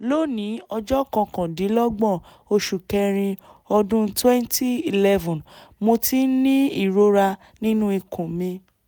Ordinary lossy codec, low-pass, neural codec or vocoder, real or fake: none; none; none; real